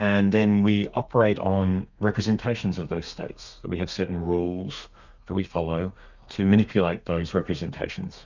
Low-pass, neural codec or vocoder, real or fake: 7.2 kHz; codec, 32 kHz, 1.9 kbps, SNAC; fake